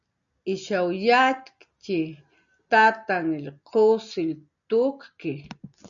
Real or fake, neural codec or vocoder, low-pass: real; none; 7.2 kHz